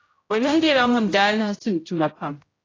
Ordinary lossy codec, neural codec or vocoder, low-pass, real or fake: AAC, 32 kbps; codec, 16 kHz, 0.5 kbps, X-Codec, HuBERT features, trained on general audio; 7.2 kHz; fake